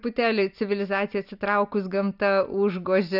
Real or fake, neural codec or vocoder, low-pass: real; none; 5.4 kHz